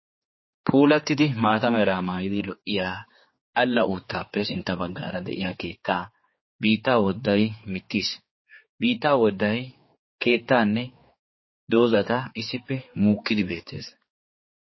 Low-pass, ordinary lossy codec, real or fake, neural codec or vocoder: 7.2 kHz; MP3, 24 kbps; fake; codec, 16 kHz, 4 kbps, X-Codec, HuBERT features, trained on general audio